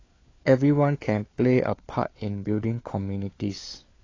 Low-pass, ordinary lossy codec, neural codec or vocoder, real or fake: 7.2 kHz; AAC, 32 kbps; codec, 16 kHz, 4 kbps, FunCodec, trained on LibriTTS, 50 frames a second; fake